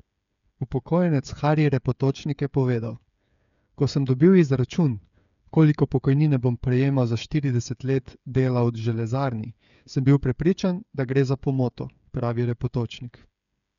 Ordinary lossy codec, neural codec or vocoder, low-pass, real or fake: none; codec, 16 kHz, 8 kbps, FreqCodec, smaller model; 7.2 kHz; fake